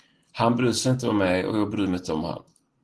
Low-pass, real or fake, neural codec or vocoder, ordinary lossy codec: 10.8 kHz; real; none; Opus, 16 kbps